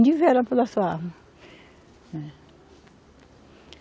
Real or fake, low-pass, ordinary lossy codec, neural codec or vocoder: real; none; none; none